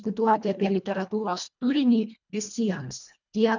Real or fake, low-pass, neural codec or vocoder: fake; 7.2 kHz; codec, 24 kHz, 1.5 kbps, HILCodec